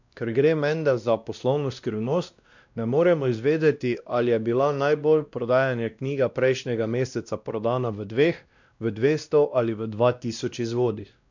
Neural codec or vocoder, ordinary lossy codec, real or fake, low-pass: codec, 16 kHz, 1 kbps, X-Codec, WavLM features, trained on Multilingual LibriSpeech; none; fake; 7.2 kHz